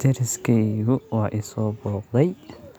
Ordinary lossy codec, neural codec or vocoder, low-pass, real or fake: none; none; none; real